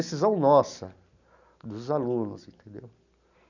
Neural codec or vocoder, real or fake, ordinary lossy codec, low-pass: none; real; none; 7.2 kHz